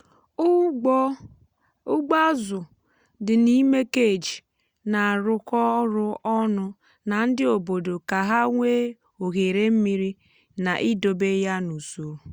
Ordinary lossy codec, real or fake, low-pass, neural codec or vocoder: Opus, 64 kbps; real; 19.8 kHz; none